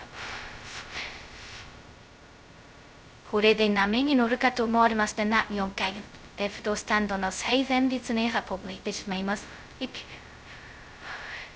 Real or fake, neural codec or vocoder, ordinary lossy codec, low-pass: fake; codec, 16 kHz, 0.2 kbps, FocalCodec; none; none